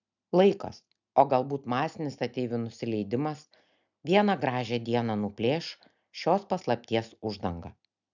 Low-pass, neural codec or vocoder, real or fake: 7.2 kHz; none; real